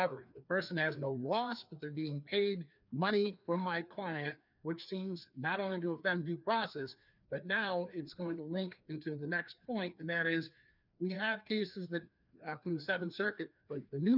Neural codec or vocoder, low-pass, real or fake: codec, 16 kHz, 2 kbps, FreqCodec, larger model; 5.4 kHz; fake